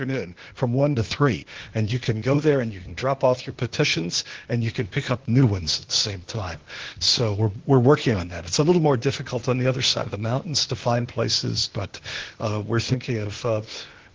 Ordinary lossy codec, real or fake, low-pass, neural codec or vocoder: Opus, 16 kbps; fake; 7.2 kHz; codec, 16 kHz, 0.8 kbps, ZipCodec